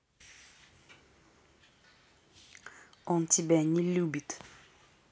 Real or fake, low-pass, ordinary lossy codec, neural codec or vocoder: real; none; none; none